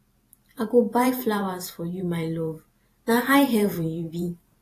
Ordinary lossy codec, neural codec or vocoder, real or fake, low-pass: AAC, 48 kbps; vocoder, 44.1 kHz, 128 mel bands every 512 samples, BigVGAN v2; fake; 14.4 kHz